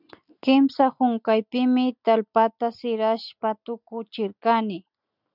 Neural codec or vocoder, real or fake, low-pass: none; real; 5.4 kHz